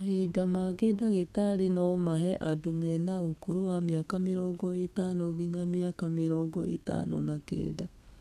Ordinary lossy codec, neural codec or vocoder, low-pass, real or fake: none; codec, 32 kHz, 1.9 kbps, SNAC; 14.4 kHz; fake